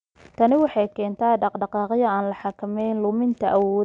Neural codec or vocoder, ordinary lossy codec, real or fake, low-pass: none; none; real; 9.9 kHz